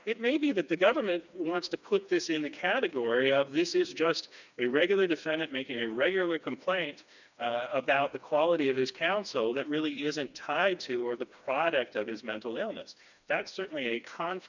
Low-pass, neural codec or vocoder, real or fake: 7.2 kHz; codec, 16 kHz, 2 kbps, FreqCodec, smaller model; fake